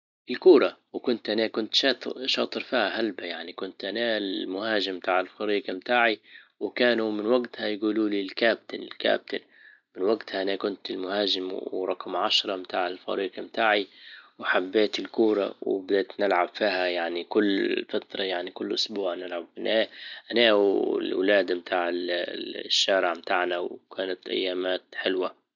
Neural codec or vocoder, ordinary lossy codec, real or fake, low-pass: none; none; real; 7.2 kHz